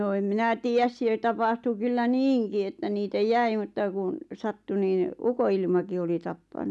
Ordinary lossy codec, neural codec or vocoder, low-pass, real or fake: none; none; none; real